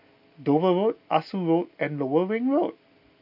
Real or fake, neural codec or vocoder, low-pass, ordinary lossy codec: real; none; 5.4 kHz; none